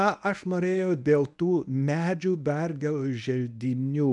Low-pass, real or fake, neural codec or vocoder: 10.8 kHz; fake; codec, 24 kHz, 0.9 kbps, WavTokenizer, medium speech release version 1